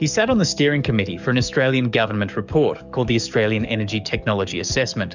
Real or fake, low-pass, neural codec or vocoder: fake; 7.2 kHz; codec, 44.1 kHz, 7.8 kbps, DAC